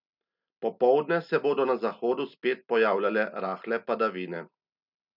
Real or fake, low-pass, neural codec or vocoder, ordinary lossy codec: real; 5.4 kHz; none; none